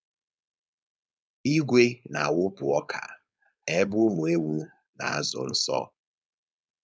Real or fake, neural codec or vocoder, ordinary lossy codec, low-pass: fake; codec, 16 kHz, 4.8 kbps, FACodec; none; none